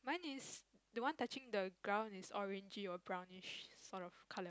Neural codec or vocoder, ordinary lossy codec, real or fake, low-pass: none; none; real; none